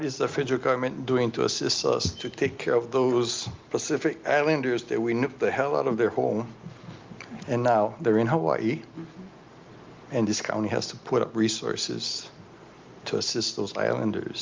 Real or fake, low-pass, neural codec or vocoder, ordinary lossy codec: real; 7.2 kHz; none; Opus, 24 kbps